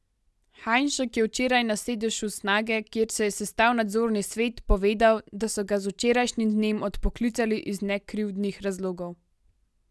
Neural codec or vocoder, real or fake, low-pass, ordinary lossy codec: none; real; none; none